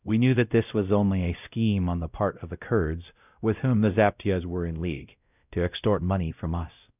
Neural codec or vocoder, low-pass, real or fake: codec, 16 kHz, 0.5 kbps, X-Codec, WavLM features, trained on Multilingual LibriSpeech; 3.6 kHz; fake